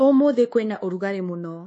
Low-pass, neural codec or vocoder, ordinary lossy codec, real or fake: 10.8 kHz; codec, 24 kHz, 1.2 kbps, DualCodec; MP3, 32 kbps; fake